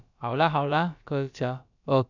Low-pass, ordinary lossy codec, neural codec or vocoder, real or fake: 7.2 kHz; none; codec, 16 kHz, about 1 kbps, DyCAST, with the encoder's durations; fake